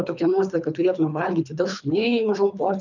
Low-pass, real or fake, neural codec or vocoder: 7.2 kHz; fake; codec, 24 kHz, 3 kbps, HILCodec